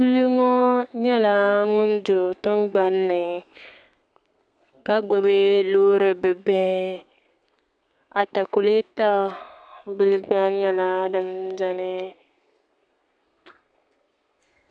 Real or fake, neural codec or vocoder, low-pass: fake; codec, 32 kHz, 1.9 kbps, SNAC; 9.9 kHz